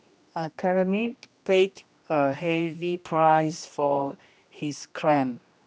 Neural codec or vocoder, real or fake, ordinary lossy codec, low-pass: codec, 16 kHz, 1 kbps, X-Codec, HuBERT features, trained on general audio; fake; none; none